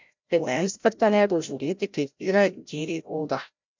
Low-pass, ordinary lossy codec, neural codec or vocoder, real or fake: 7.2 kHz; MP3, 64 kbps; codec, 16 kHz, 0.5 kbps, FreqCodec, larger model; fake